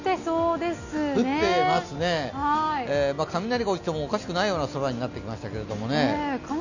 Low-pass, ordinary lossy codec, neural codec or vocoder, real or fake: 7.2 kHz; none; none; real